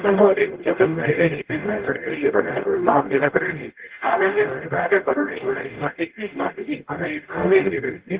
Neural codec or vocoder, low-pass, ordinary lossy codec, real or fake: codec, 44.1 kHz, 0.9 kbps, DAC; 3.6 kHz; Opus, 16 kbps; fake